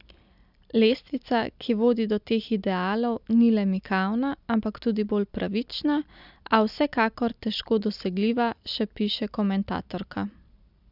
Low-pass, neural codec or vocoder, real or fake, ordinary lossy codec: 5.4 kHz; none; real; none